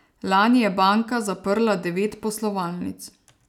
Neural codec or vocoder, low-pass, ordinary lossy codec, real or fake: none; 19.8 kHz; none; real